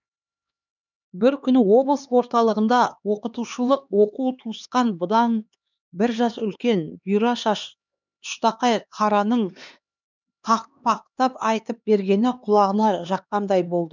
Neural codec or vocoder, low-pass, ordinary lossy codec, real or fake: codec, 16 kHz, 2 kbps, X-Codec, HuBERT features, trained on LibriSpeech; 7.2 kHz; none; fake